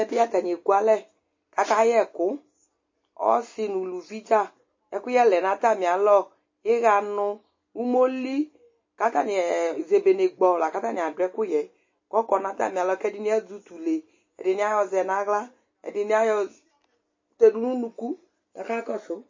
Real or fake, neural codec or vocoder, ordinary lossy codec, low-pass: real; none; MP3, 32 kbps; 7.2 kHz